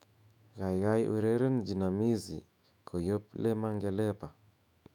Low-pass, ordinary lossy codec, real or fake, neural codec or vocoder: 19.8 kHz; none; fake; autoencoder, 48 kHz, 128 numbers a frame, DAC-VAE, trained on Japanese speech